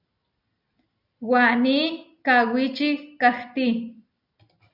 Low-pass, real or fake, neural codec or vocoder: 5.4 kHz; real; none